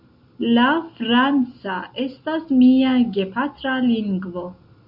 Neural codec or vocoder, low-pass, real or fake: none; 5.4 kHz; real